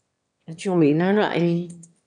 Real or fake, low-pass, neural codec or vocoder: fake; 9.9 kHz; autoencoder, 22.05 kHz, a latent of 192 numbers a frame, VITS, trained on one speaker